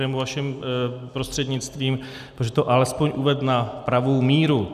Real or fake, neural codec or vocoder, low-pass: real; none; 14.4 kHz